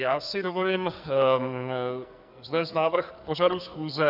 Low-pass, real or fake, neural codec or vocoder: 5.4 kHz; fake; codec, 44.1 kHz, 2.6 kbps, SNAC